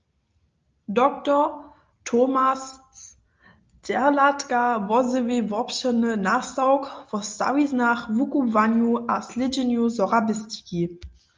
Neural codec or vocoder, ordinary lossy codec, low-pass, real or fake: none; Opus, 24 kbps; 7.2 kHz; real